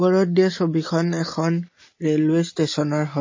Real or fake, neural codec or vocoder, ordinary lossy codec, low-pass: real; none; MP3, 32 kbps; 7.2 kHz